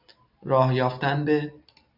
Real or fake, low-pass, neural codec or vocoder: real; 5.4 kHz; none